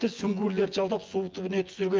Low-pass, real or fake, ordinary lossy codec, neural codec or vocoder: 7.2 kHz; fake; Opus, 32 kbps; vocoder, 24 kHz, 100 mel bands, Vocos